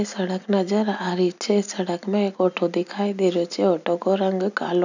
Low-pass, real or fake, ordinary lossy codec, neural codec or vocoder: 7.2 kHz; real; none; none